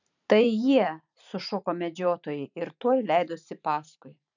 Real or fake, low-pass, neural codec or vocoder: fake; 7.2 kHz; vocoder, 44.1 kHz, 128 mel bands every 512 samples, BigVGAN v2